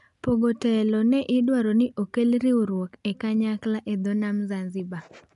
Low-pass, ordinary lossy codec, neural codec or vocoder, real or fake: 10.8 kHz; none; none; real